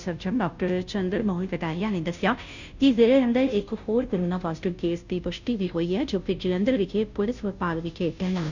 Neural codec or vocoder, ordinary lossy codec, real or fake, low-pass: codec, 16 kHz, 0.5 kbps, FunCodec, trained on Chinese and English, 25 frames a second; none; fake; 7.2 kHz